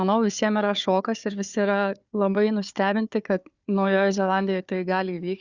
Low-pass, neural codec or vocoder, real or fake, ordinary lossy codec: 7.2 kHz; codec, 16 kHz, 4 kbps, FunCodec, trained on Chinese and English, 50 frames a second; fake; Opus, 64 kbps